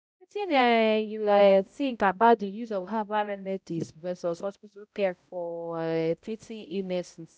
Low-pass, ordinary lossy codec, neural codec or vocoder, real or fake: none; none; codec, 16 kHz, 0.5 kbps, X-Codec, HuBERT features, trained on balanced general audio; fake